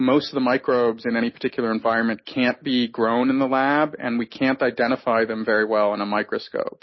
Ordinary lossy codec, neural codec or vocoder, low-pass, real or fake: MP3, 24 kbps; none; 7.2 kHz; real